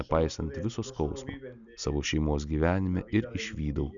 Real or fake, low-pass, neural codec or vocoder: real; 7.2 kHz; none